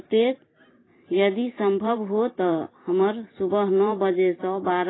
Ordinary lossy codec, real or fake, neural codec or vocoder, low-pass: AAC, 16 kbps; fake; vocoder, 44.1 kHz, 128 mel bands every 512 samples, BigVGAN v2; 7.2 kHz